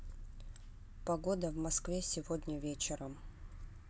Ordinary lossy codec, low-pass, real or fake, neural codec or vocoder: none; none; real; none